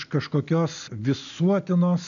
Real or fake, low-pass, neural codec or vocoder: real; 7.2 kHz; none